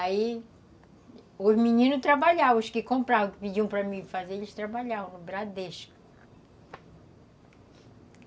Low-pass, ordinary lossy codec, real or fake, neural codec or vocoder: none; none; real; none